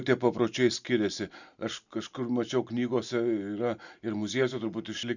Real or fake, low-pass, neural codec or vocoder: real; 7.2 kHz; none